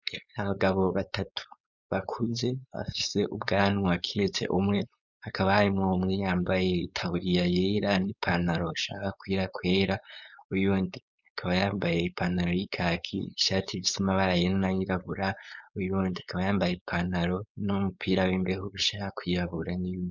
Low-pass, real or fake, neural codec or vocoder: 7.2 kHz; fake; codec, 16 kHz, 4.8 kbps, FACodec